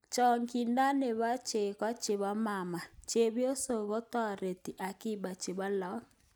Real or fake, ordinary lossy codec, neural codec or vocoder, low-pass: real; none; none; none